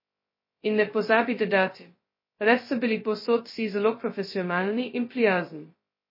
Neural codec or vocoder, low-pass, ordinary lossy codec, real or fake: codec, 16 kHz, 0.2 kbps, FocalCodec; 5.4 kHz; MP3, 24 kbps; fake